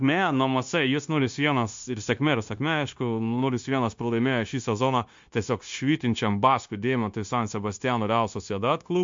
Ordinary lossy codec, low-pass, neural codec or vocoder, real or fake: MP3, 48 kbps; 7.2 kHz; codec, 16 kHz, 0.9 kbps, LongCat-Audio-Codec; fake